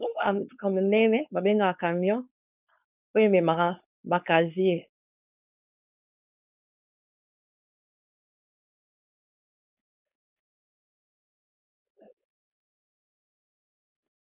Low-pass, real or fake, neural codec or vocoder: 3.6 kHz; fake; codec, 16 kHz, 4.8 kbps, FACodec